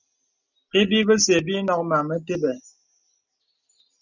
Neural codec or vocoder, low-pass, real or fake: none; 7.2 kHz; real